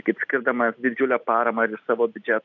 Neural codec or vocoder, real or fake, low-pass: none; real; 7.2 kHz